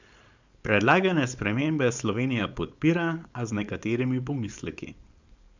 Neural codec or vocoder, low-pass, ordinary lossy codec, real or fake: codec, 16 kHz, 4.8 kbps, FACodec; 7.2 kHz; Opus, 64 kbps; fake